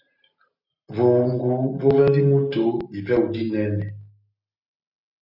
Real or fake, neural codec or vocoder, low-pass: real; none; 5.4 kHz